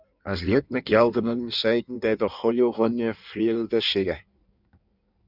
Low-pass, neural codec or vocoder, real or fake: 5.4 kHz; codec, 16 kHz in and 24 kHz out, 1.1 kbps, FireRedTTS-2 codec; fake